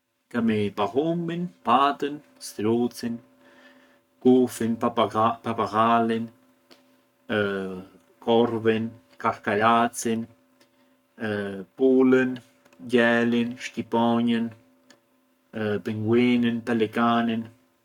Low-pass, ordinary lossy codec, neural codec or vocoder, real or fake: 19.8 kHz; none; codec, 44.1 kHz, 7.8 kbps, Pupu-Codec; fake